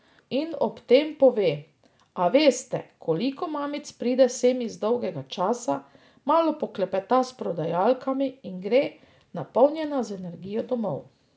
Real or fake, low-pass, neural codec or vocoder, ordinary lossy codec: real; none; none; none